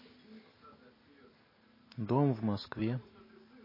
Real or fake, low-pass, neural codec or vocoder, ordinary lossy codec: real; 5.4 kHz; none; MP3, 24 kbps